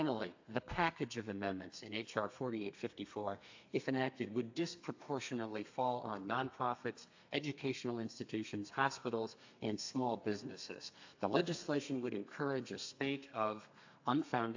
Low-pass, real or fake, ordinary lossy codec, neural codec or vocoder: 7.2 kHz; fake; AAC, 48 kbps; codec, 32 kHz, 1.9 kbps, SNAC